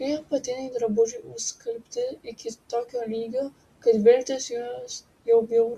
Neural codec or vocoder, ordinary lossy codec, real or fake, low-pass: none; Opus, 64 kbps; real; 14.4 kHz